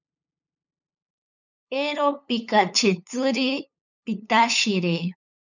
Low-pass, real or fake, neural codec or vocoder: 7.2 kHz; fake; codec, 16 kHz, 8 kbps, FunCodec, trained on LibriTTS, 25 frames a second